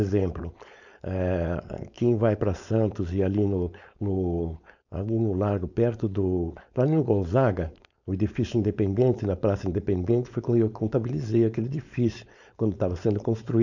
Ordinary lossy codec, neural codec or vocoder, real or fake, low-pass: none; codec, 16 kHz, 4.8 kbps, FACodec; fake; 7.2 kHz